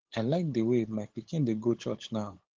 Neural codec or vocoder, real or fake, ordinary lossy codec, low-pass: codec, 16 kHz, 8 kbps, FunCodec, trained on LibriTTS, 25 frames a second; fake; Opus, 16 kbps; 7.2 kHz